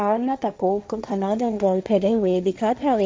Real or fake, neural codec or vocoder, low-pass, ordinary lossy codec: fake; codec, 16 kHz, 1.1 kbps, Voila-Tokenizer; none; none